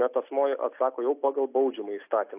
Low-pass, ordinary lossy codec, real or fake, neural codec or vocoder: 3.6 kHz; AAC, 32 kbps; real; none